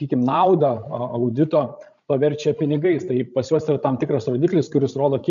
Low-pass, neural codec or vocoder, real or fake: 7.2 kHz; codec, 16 kHz, 16 kbps, FreqCodec, larger model; fake